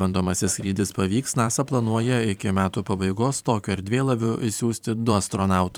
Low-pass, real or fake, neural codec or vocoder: 19.8 kHz; fake; vocoder, 44.1 kHz, 128 mel bands every 512 samples, BigVGAN v2